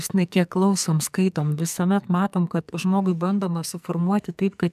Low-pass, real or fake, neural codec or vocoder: 14.4 kHz; fake; codec, 44.1 kHz, 2.6 kbps, SNAC